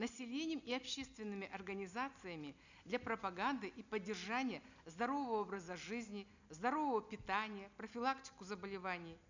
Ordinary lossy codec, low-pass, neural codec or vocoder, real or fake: none; 7.2 kHz; none; real